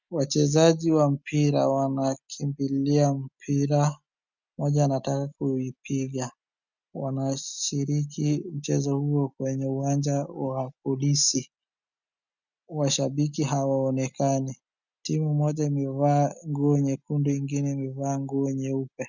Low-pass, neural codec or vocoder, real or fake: 7.2 kHz; none; real